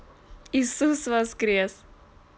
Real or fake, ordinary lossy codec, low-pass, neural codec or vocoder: real; none; none; none